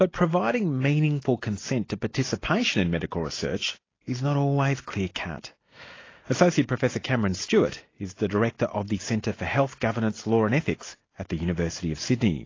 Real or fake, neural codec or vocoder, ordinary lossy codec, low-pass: real; none; AAC, 32 kbps; 7.2 kHz